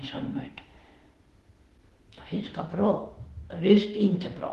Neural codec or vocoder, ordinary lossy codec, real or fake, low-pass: autoencoder, 48 kHz, 32 numbers a frame, DAC-VAE, trained on Japanese speech; Opus, 16 kbps; fake; 14.4 kHz